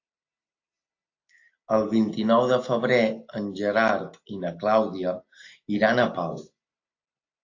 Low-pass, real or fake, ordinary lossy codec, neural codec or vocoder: 7.2 kHz; real; AAC, 48 kbps; none